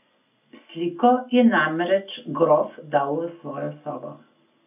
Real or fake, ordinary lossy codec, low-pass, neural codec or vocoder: real; none; 3.6 kHz; none